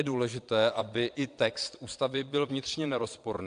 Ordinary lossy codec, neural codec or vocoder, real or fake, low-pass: AAC, 64 kbps; vocoder, 22.05 kHz, 80 mel bands, Vocos; fake; 9.9 kHz